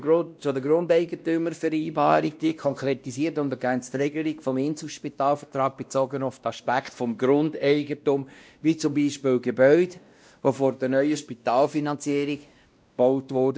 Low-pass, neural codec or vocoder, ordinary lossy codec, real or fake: none; codec, 16 kHz, 1 kbps, X-Codec, WavLM features, trained on Multilingual LibriSpeech; none; fake